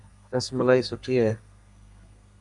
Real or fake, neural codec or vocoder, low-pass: fake; codec, 32 kHz, 1.9 kbps, SNAC; 10.8 kHz